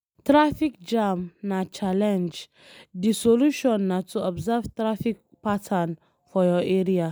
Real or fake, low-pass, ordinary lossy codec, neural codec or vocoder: real; none; none; none